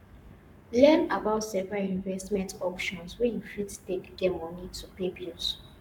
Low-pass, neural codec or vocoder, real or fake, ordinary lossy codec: 19.8 kHz; codec, 44.1 kHz, 7.8 kbps, Pupu-Codec; fake; none